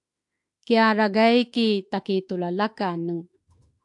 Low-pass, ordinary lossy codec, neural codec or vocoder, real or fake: 10.8 kHz; AAC, 64 kbps; autoencoder, 48 kHz, 32 numbers a frame, DAC-VAE, trained on Japanese speech; fake